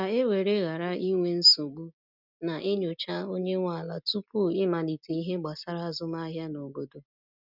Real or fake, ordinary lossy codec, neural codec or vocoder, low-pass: real; none; none; 5.4 kHz